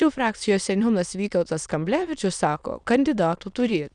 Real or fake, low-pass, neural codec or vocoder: fake; 9.9 kHz; autoencoder, 22.05 kHz, a latent of 192 numbers a frame, VITS, trained on many speakers